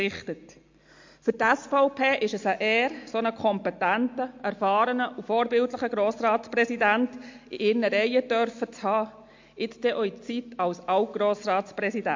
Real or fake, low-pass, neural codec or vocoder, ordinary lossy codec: real; 7.2 kHz; none; MP3, 48 kbps